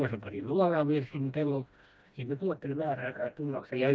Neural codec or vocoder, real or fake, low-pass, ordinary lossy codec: codec, 16 kHz, 1 kbps, FreqCodec, smaller model; fake; none; none